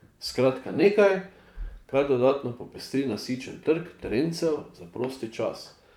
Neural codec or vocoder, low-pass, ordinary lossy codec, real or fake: vocoder, 44.1 kHz, 128 mel bands, Pupu-Vocoder; 19.8 kHz; none; fake